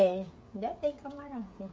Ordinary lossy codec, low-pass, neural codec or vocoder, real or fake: none; none; codec, 16 kHz, 16 kbps, FreqCodec, smaller model; fake